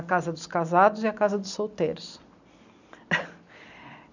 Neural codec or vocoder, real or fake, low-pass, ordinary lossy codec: none; real; 7.2 kHz; none